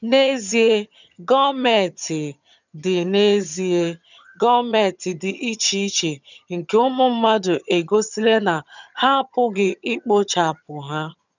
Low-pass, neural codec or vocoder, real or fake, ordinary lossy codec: 7.2 kHz; vocoder, 22.05 kHz, 80 mel bands, HiFi-GAN; fake; none